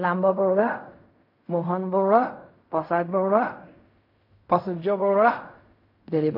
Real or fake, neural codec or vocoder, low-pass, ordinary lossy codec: fake; codec, 16 kHz in and 24 kHz out, 0.4 kbps, LongCat-Audio-Codec, fine tuned four codebook decoder; 5.4 kHz; MP3, 32 kbps